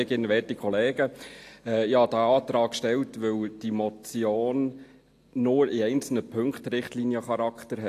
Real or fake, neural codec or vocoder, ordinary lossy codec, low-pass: real; none; AAC, 64 kbps; 14.4 kHz